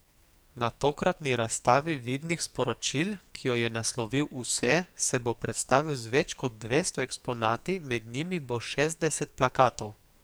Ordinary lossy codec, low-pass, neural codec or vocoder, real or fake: none; none; codec, 44.1 kHz, 2.6 kbps, SNAC; fake